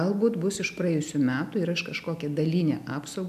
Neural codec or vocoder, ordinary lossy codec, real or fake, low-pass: none; AAC, 96 kbps; real; 14.4 kHz